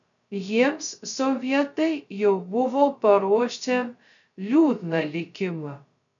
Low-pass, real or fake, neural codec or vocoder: 7.2 kHz; fake; codec, 16 kHz, 0.2 kbps, FocalCodec